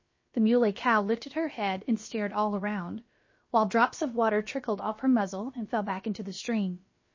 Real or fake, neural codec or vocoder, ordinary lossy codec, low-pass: fake; codec, 16 kHz, about 1 kbps, DyCAST, with the encoder's durations; MP3, 32 kbps; 7.2 kHz